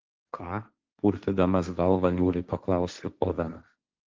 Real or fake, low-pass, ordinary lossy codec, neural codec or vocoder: fake; 7.2 kHz; Opus, 32 kbps; codec, 16 kHz, 1.1 kbps, Voila-Tokenizer